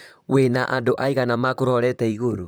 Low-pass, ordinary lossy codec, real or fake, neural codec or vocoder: none; none; fake; vocoder, 44.1 kHz, 128 mel bands, Pupu-Vocoder